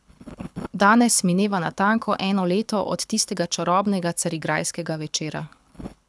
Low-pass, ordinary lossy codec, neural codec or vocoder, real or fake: none; none; codec, 24 kHz, 6 kbps, HILCodec; fake